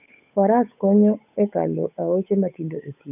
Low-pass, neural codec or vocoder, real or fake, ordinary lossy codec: 3.6 kHz; codec, 16 kHz, 8 kbps, FunCodec, trained on Chinese and English, 25 frames a second; fake; none